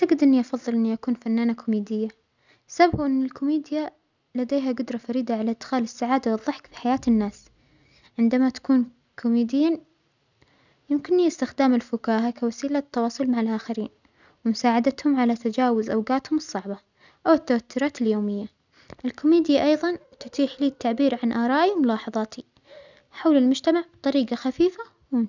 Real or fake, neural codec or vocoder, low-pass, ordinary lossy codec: real; none; 7.2 kHz; none